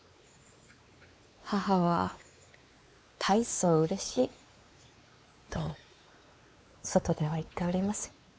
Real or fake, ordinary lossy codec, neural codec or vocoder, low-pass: fake; none; codec, 16 kHz, 4 kbps, X-Codec, WavLM features, trained on Multilingual LibriSpeech; none